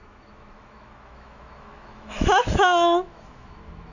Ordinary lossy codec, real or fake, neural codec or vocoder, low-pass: none; fake; autoencoder, 48 kHz, 128 numbers a frame, DAC-VAE, trained on Japanese speech; 7.2 kHz